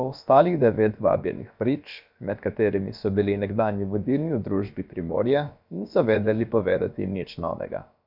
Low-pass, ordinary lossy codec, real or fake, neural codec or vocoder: 5.4 kHz; none; fake; codec, 16 kHz, about 1 kbps, DyCAST, with the encoder's durations